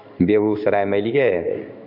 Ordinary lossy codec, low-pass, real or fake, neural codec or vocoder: none; 5.4 kHz; fake; codec, 16 kHz in and 24 kHz out, 1 kbps, XY-Tokenizer